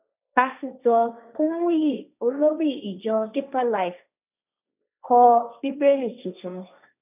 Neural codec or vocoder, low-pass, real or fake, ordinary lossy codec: codec, 16 kHz, 1.1 kbps, Voila-Tokenizer; 3.6 kHz; fake; none